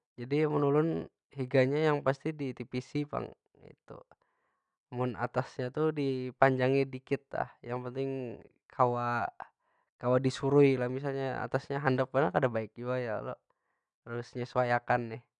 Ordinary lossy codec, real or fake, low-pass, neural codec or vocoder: none; real; 9.9 kHz; none